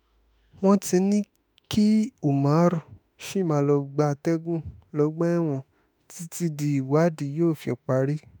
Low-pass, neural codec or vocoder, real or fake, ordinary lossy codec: none; autoencoder, 48 kHz, 32 numbers a frame, DAC-VAE, trained on Japanese speech; fake; none